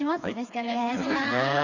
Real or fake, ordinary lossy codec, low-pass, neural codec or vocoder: fake; none; 7.2 kHz; codec, 16 kHz, 4 kbps, FreqCodec, smaller model